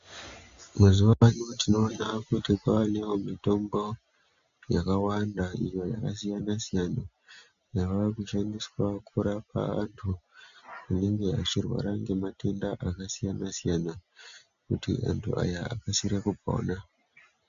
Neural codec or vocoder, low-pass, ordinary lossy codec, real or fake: none; 7.2 kHz; MP3, 64 kbps; real